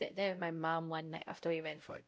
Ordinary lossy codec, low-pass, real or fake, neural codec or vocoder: none; none; fake; codec, 16 kHz, 0.5 kbps, X-Codec, WavLM features, trained on Multilingual LibriSpeech